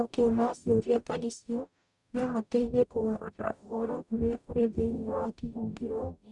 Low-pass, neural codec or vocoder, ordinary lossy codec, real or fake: 10.8 kHz; codec, 44.1 kHz, 0.9 kbps, DAC; none; fake